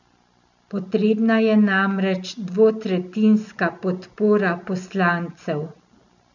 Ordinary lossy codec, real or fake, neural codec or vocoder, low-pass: none; real; none; 7.2 kHz